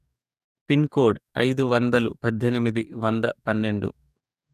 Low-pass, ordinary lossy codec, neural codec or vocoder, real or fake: 14.4 kHz; none; codec, 44.1 kHz, 2.6 kbps, DAC; fake